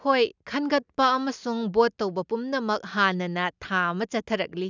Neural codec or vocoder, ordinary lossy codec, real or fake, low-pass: none; none; real; 7.2 kHz